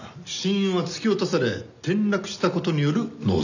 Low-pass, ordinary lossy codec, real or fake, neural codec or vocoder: 7.2 kHz; none; real; none